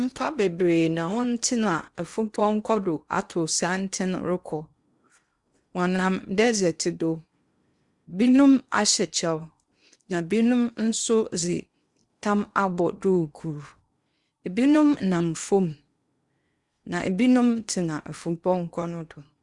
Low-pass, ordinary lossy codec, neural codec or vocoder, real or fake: 10.8 kHz; Opus, 64 kbps; codec, 16 kHz in and 24 kHz out, 0.8 kbps, FocalCodec, streaming, 65536 codes; fake